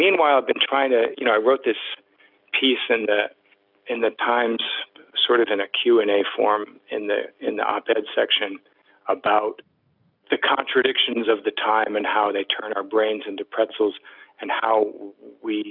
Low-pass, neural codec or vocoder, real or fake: 5.4 kHz; none; real